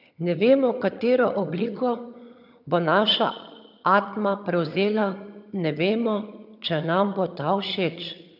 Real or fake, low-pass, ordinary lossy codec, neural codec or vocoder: fake; 5.4 kHz; none; vocoder, 22.05 kHz, 80 mel bands, HiFi-GAN